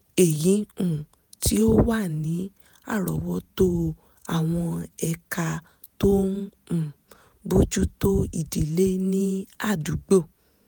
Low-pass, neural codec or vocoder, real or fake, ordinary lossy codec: none; vocoder, 48 kHz, 128 mel bands, Vocos; fake; none